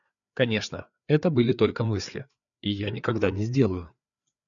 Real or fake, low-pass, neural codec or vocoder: fake; 7.2 kHz; codec, 16 kHz, 4 kbps, FreqCodec, larger model